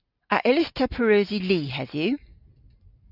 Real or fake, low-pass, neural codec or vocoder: real; 5.4 kHz; none